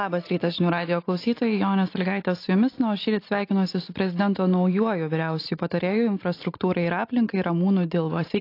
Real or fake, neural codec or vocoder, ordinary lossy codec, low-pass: real; none; AAC, 32 kbps; 5.4 kHz